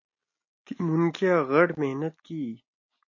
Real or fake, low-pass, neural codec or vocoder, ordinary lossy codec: real; 7.2 kHz; none; MP3, 32 kbps